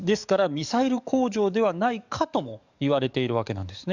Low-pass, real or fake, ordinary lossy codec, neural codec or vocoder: 7.2 kHz; fake; none; codec, 44.1 kHz, 7.8 kbps, DAC